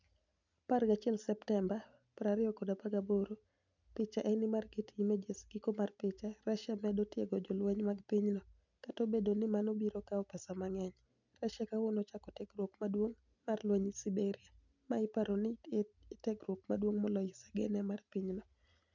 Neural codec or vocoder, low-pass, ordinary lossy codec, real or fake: none; 7.2 kHz; none; real